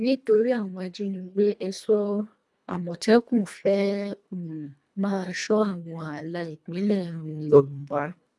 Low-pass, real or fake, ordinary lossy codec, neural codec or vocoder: none; fake; none; codec, 24 kHz, 1.5 kbps, HILCodec